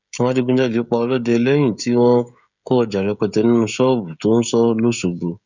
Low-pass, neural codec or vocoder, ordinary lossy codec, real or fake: 7.2 kHz; codec, 16 kHz, 16 kbps, FreqCodec, smaller model; none; fake